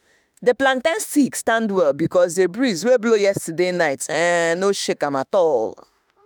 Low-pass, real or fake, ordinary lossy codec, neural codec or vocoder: none; fake; none; autoencoder, 48 kHz, 32 numbers a frame, DAC-VAE, trained on Japanese speech